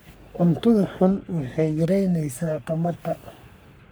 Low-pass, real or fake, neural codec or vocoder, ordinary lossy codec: none; fake; codec, 44.1 kHz, 3.4 kbps, Pupu-Codec; none